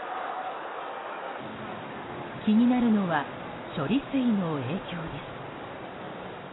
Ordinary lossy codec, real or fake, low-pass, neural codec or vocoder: AAC, 16 kbps; real; 7.2 kHz; none